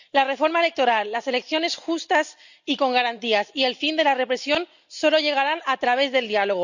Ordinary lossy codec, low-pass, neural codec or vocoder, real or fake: none; 7.2 kHz; none; real